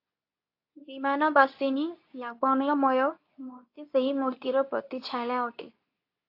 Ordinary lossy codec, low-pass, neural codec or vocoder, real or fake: AAC, 32 kbps; 5.4 kHz; codec, 24 kHz, 0.9 kbps, WavTokenizer, medium speech release version 2; fake